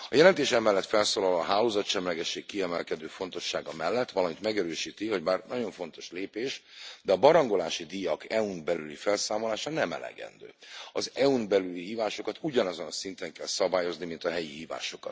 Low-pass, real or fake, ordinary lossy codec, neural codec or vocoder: none; real; none; none